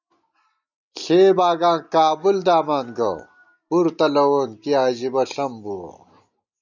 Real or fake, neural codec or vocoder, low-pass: real; none; 7.2 kHz